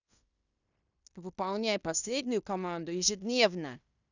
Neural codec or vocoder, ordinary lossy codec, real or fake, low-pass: codec, 16 kHz in and 24 kHz out, 0.9 kbps, LongCat-Audio-Codec, fine tuned four codebook decoder; none; fake; 7.2 kHz